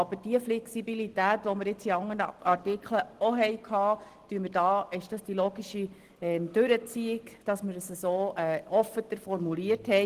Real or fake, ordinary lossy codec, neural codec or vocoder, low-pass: real; Opus, 16 kbps; none; 14.4 kHz